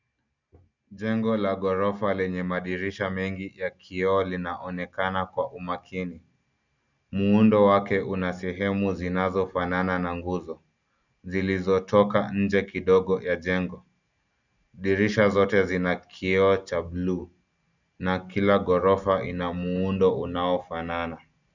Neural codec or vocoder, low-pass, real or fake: none; 7.2 kHz; real